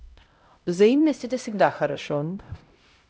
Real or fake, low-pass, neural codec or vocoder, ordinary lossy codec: fake; none; codec, 16 kHz, 0.5 kbps, X-Codec, HuBERT features, trained on LibriSpeech; none